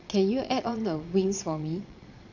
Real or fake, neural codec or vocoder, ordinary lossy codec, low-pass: fake; vocoder, 22.05 kHz, 80 mel bands, Vocos; none; 7.2 kHz